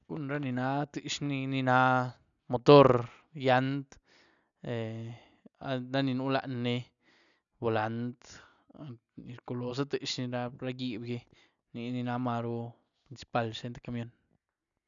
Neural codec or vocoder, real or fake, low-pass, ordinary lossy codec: none; real; 7.2 kHz; none